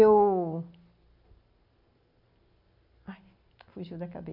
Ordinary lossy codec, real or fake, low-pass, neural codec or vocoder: none; real; 5.4 kHz; none